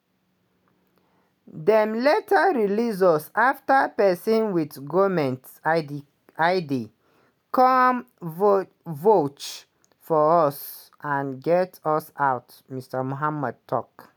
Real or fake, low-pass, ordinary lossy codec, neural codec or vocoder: real; none; none; none